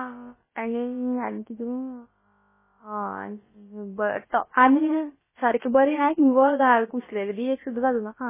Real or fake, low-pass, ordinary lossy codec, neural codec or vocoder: fake; 3.6 kHz; MP3, 16 kbps; codec, 16 kHz, about 1 kbps, DyCAST, with the encoder's durations